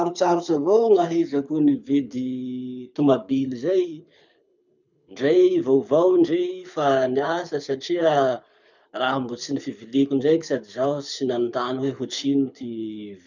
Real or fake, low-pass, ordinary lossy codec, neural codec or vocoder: fake; 7.2 kHz; none; codec, 24 kHz, 6 kbps, HILCodec